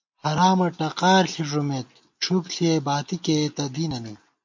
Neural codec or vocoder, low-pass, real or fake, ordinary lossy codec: none; 7.2 kHz; real; MP3, 48 kbps